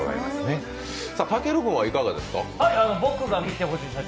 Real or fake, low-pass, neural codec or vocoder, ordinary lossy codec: real; none; none; none